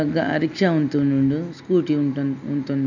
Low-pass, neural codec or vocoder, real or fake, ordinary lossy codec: 7.2 kHz; none; real; none